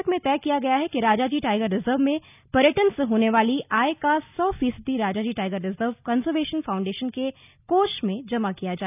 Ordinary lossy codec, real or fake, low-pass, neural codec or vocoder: none; real; 3.6 kHz; none